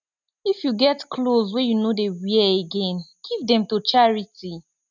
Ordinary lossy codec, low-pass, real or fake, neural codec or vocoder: none; 7.2 kHz; real; none